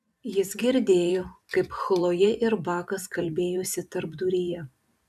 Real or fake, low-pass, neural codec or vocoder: fake; 14.4 kHz; vocoder, 44.1 kHz, 128 mel bands every 512 samples, BigVGAN v2